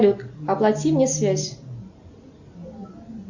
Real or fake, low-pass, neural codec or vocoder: real; 7.2 kHz; none